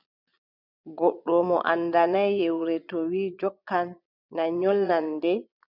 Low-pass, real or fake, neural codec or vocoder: 5.4 kHz; fake; vocoder, 22.05 kHz, 80 mel bands, Vocos